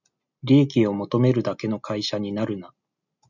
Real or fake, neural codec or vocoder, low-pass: real; none; 7.2 kHz